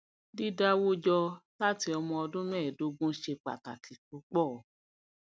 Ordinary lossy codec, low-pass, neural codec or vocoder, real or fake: none; none; none; real